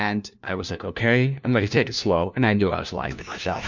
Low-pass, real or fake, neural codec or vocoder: 7.2 kHz; fake; codec, 16 kHz, 1 kbps, FunCodec, trained on LibriTTS, 50 frames a second